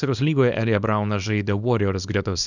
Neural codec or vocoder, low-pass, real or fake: codec, 24 kHz, 0.9 kbps, WavTokenizer, small release; 7.2 kHz; fake